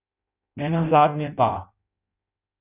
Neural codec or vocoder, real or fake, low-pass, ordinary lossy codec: codec, 16 kHz in and 24 kHz out, 0.6 kbps, FireRedTTS-2 codec; fake; 3.6 kHz; none